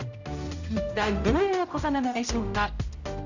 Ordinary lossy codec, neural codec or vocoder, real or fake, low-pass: none; codec, 16 kHz, 0.5 kbps, X-Codec, HuBERT features, trained on general audio; fake; 7.2 kHz